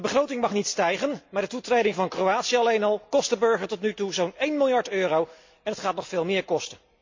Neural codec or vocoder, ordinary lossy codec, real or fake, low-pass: none; MP3, 48 kbps; real; 7.2 kHz